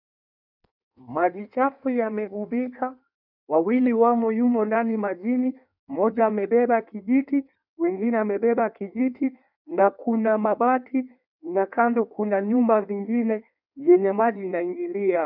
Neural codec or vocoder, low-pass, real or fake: codec, 16 kHz in and 24 kHz out, 1.1 kbps, FireRedTTS-2 codec; 5.4 kHz; fake